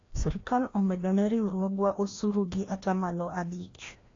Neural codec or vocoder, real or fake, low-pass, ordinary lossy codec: codec, 16 kHz, 1 kbps, FreqCodec, larger model; fake; 7.2 kHz; AAC, 32 kbps